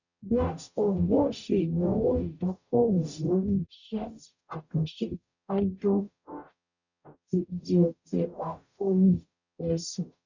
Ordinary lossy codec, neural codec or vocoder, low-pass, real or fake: none; codec, 44.1 kHz, 0.9 kbps, DAC; 7.2 kHz; fake